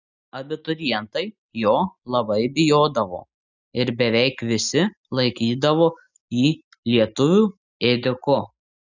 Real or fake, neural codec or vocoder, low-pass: real; none; 7.2 kHz